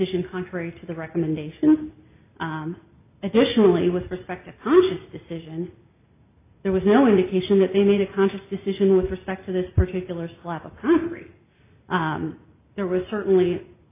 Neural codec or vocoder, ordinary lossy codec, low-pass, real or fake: none; AAC, 24 kbps; 3.6 kHz; real